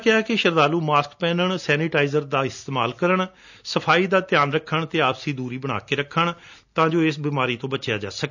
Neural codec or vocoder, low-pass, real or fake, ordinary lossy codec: none; 7.2 kHz; real; none